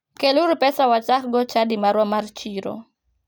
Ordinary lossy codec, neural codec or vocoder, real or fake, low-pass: none; none; real; none